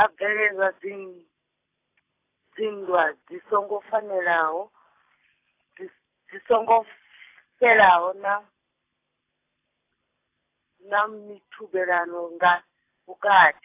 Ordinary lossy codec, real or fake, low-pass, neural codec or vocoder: AAC, 24 kbps; fake; 3.6 kHz; vocoder, 44.1 kHz, 128 mel bands every 256 samples, BigVGAN v2